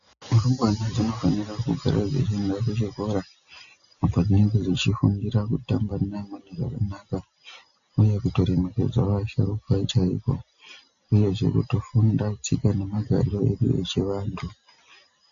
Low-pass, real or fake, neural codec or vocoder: 7.2 kHz; real; none